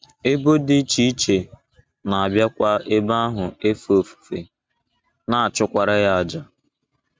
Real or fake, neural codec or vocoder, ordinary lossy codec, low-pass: real; none; none; none